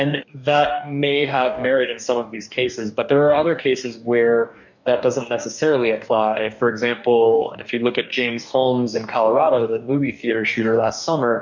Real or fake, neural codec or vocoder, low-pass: fake; codec, 44.1 kHz, 2.6 kbps, DAC; 7.2 kHz